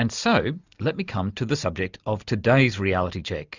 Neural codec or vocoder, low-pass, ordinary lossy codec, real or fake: none; 7.2 kHz; Opus, 64 kbps; real